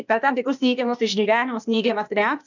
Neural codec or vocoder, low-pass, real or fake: codec, 16 kHz, 0.8 kbps, ZipCodec; 7.2 kHz; fake